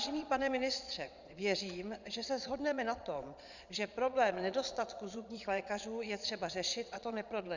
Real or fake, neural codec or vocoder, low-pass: fake; vocoder, 22.05 kHz, 80 mel bands, Vocos; 7.2 kHz